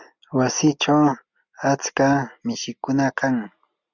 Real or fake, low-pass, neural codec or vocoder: real; 7.2 kHz; none